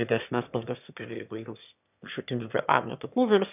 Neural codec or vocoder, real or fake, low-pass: autoencoder, 22.05 kHz, a latent of 192 numbers a frame, VITS, trained on one speaker; fake; 3.6 kHz